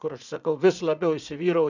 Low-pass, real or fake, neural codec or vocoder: 7.2 kHz; fake; vocoder, 44.1 kHz, 80 mel bands, Vocos